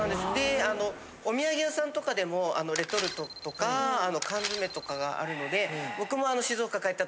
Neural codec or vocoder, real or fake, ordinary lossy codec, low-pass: none; real; none; none